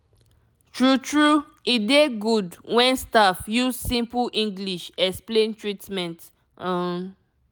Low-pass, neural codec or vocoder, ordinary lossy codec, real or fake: none; none; none; real